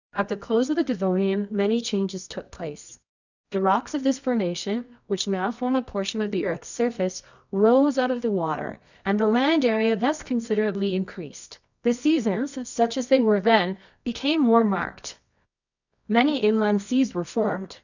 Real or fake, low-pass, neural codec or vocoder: fake; 7.2 kHz; codec, 24 kHz, 0.9 kbps, WavTokenizer, medium music audio release